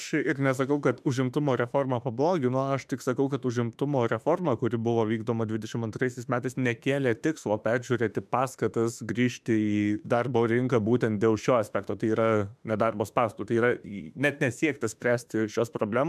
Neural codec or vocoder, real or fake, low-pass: autoencoder, 48 kHz, 32 numbers a frame, DAC-VAE, trained on Japanese speech; fake; 14.4 kHz